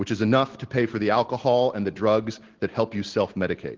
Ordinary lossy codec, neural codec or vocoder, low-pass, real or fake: Opus, 16 kbps; none; 7.2 kHz; real